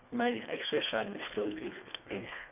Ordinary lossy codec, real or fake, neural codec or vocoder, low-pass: none; fake; codec, 24 kHz, 1.5 kbps, HILCodec; 3.6 kHz